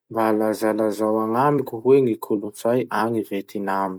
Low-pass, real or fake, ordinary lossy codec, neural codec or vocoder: none; fake; none; vocoder, 44.1 kHz, 128 mel bands every 256 samples, BigVGAN v2